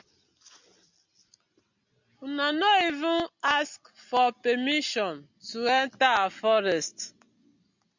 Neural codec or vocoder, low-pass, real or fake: none; 7.2 kHz; real